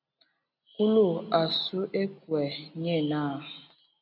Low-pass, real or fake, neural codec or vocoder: 5.4 kHz; real; none